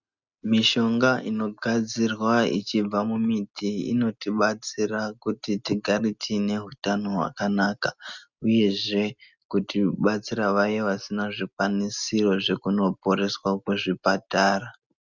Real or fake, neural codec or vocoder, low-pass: real; none; 7.2 kHz